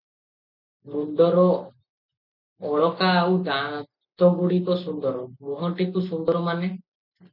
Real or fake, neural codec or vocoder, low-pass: real; none; 5.4 kHz